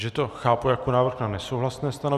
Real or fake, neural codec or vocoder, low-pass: real; none; 14.4 kHz